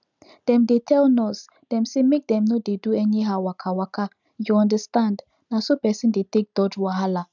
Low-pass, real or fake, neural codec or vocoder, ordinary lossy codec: 7.2 kHz; real; none; none